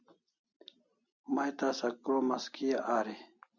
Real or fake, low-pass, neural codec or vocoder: real; 7.2 kHz; none